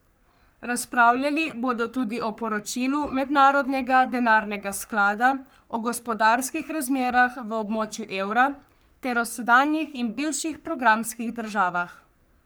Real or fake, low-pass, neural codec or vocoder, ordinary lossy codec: fake; none; codec, 44.1 kHz, 3.4 kbps, Pupu-Codec; none